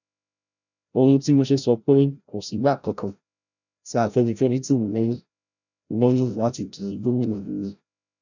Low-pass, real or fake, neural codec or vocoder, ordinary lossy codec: 7.2 kHz; fake; codec, 16 kHz, 0.5 kbps, FreqCodec, larger model; none